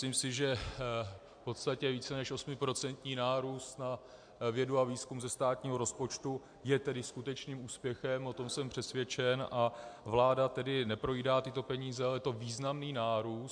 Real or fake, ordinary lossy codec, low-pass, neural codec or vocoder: real; MP3, 64 kbps; 9.9 kHz; none